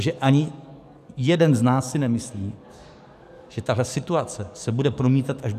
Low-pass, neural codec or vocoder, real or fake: 14.4 kHz; codec, 44.1 kHz, 7.8 kbps, DAC; fake